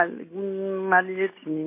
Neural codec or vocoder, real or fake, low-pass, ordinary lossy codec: none; real; 3.6 kHz; MP3, 24 kbps